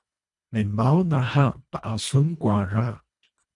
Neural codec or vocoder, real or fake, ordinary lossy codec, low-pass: codec, 24 kHz, 1.5 kbps, HILCodec; fake; MP3, 96 kbps; 10.8 kHz